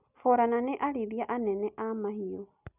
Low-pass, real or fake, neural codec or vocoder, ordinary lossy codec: 3.6 kHz; real; none; none